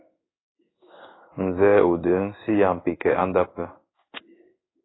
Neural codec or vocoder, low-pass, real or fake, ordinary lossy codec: codec, 16 kHz in and 24 kHz out, 1 kbps, XY-Tokenizer; 7.2 kHz; fake; AAC, 16 kbps